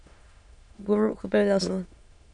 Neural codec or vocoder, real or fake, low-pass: autoencoder, 22.05 kHz, a latent of 192 numbers a frame, VITS, trained on many speakers; fake; 9.9 kHz